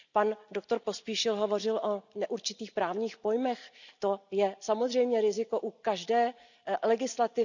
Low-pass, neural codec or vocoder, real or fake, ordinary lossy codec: 7.2 kHz; none; real; none